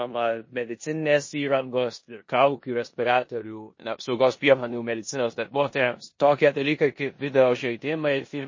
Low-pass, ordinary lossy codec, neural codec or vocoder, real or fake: 7.2 kHz; MP3, 32 kbps; codec, 16 kHz in and 24 kHz out, 0.9 kbps, LongCat-Audio-Codec, four codebook decoder; fake